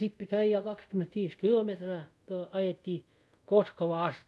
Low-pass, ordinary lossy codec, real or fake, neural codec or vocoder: none; none; fake; codec, 24 kHz, 0.5 kbps, DualCodec